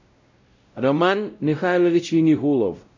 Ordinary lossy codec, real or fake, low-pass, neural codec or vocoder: MP3, 64 kbps; fake; 7.2 kHz; codec, 16 kHz, 0.5 kbps, X-Codec, WavLM features, trained on Multilingual LibriSpeech